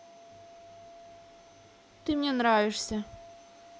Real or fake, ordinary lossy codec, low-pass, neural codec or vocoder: real; none; none; none